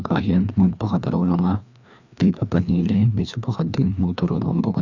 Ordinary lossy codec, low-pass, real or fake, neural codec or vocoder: none; 7.2 kHz; fake; codec, 16 kHz, 2 kbps, FreqCodec, larger model